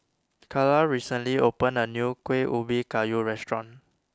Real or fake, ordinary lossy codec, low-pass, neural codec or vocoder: real; none; none; none